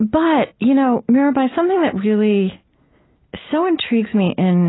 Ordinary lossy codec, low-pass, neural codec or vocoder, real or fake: AAC, 16 kbps; 7.2 kHz; none; real